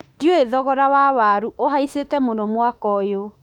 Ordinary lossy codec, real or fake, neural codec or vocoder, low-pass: none; fake; autoencoder, 48 kHz, 32 numbers a frame, DAC-VAE, trained on Japanese speech; 19.8 kHz